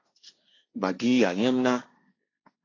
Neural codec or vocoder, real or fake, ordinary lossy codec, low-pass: codec, 16 kHz, 1.1 kbps, Voila-Tokenizer; fake; AAC, 32 kbps; 7.2 kHz